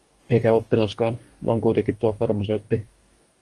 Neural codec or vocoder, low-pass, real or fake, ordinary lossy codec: codec, 44.1 kHz, 2.6 kbps, DAC; 10.8 kHz; fake; Opus, 24 kbps